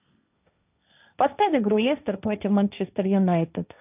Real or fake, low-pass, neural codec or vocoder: fake; 3.6 kHz; codec, 16 kHz, 1.1 kbps, Voila-Tokenizer